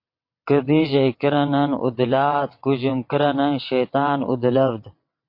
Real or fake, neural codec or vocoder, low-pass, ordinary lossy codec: fake; vocoder, 22.05 kHz, 80 mel bands, WaveNeXt; 5.4 kHz; MP3, 32 kbps